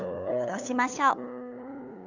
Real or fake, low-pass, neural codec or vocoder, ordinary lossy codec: fake; 7.2 kHz; codec, 16 kHz, 8 kbps, FunCodec, trained on LibriTTS, 25 frames a second; none